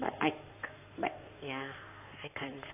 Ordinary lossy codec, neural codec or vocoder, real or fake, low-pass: AAC, 24 kbps; codec, 16 kHz in and 24 kHz out, 2.2 kbps, FireRedTTS-2 codec; fake; 3.6 kHz